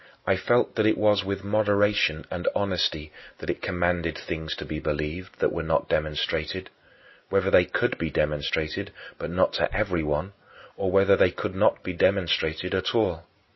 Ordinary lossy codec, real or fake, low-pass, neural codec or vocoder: MP3, 24 kbps; real; 7.2 kHz; none